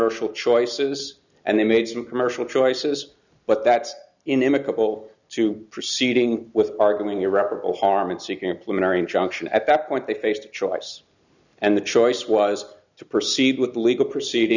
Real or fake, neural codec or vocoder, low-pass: real; none; 7.2 kHz